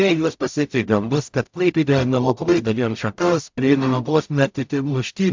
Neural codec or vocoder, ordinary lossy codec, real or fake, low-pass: codec, 44.1 kHz, 0.9 kbps, DAC; MP3, 64 kbps; fake; 7.2 kHz